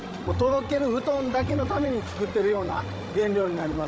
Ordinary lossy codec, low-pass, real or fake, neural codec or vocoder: none; none; fake; codec, 16 kHz, 16 kbps, FreqCodec, larger model